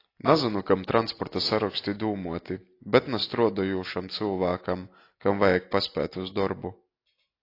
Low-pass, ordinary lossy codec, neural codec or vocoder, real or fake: 5.4 kHz; AAC, 32 kbps; none; real